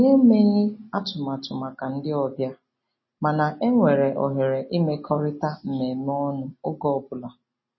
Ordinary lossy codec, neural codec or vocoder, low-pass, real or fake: MP3, 24 kbps; none; 7.2 kHz; real